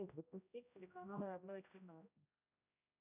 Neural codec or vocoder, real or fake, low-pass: codec, 16 kHz, 0.5 kbps, X-Codec, HuBERT features, trained on general audio; fake; 3.6 kHz